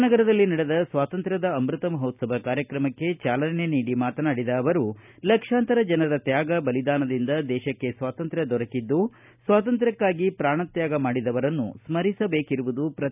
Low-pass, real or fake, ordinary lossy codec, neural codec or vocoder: 3.6 kHz; real; none; none